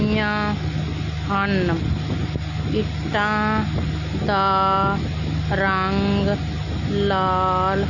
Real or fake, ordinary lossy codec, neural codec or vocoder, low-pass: real; none; none; 7.2 kHz